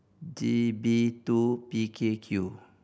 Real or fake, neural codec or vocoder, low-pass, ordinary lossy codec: real; none; none; none